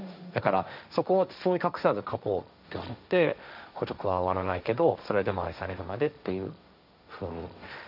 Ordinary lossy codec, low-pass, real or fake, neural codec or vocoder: none; 5.4 kHz; fake; codec, 16 kHz, 1.1 kbps, Voila-Tokenizer